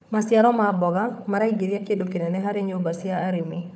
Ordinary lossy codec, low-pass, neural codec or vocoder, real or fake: none; none; codec, 16 kHz, 4 kbps, FunCodec, trained on Chinese and English, 50 frames a second; fake